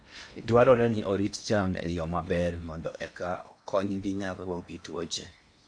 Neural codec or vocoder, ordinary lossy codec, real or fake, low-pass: codec, 16 kHz in and 24 kHz out, 0.8 kbps, FocalCodec, streaming, 65536 codes; none; fake; 9.9 kHz